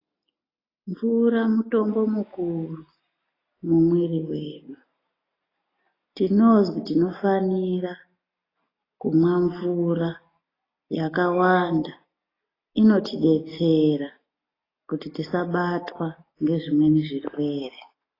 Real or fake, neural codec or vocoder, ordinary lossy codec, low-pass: real; none; AAC, 24 kbps; 5.4 kHz